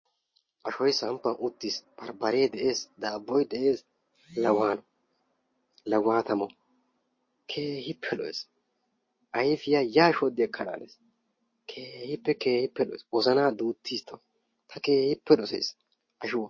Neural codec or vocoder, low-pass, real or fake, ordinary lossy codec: none; 7.2 kHz; real; MP3, 32 kbps